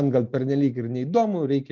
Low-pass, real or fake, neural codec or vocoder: 7.2 kHz; real; none